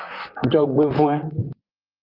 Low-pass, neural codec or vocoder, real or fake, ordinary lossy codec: 5.4 kHz; vocoder, 44.1 kHz, 128 mel bands, Pupu-Vocoder; fake; Opus, 32 kbps